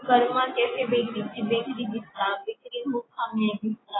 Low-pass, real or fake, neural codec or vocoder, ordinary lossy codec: 7.2 kHz; real; none; AAC, 16 kbps